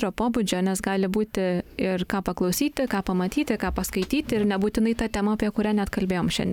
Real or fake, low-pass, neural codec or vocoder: real; 19.8 kHz; none